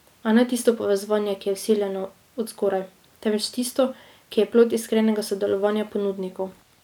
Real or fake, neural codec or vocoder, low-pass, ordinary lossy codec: real; none; 19.8 kHz; none